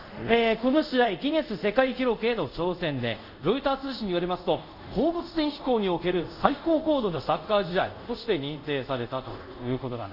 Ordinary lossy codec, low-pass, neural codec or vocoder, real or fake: none; 5.4 kHz; codec, 24 kHz, 0.5 kbps, DualCodec; fake